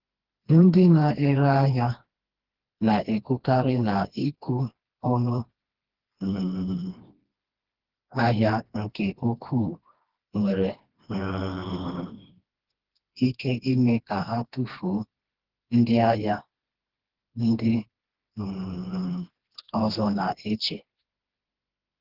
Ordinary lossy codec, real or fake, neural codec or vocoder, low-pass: Opus, 16 kbps; fake; codec, 16 kHz, 2 kbps, FreqCodec, smaller model; 5.4 kHz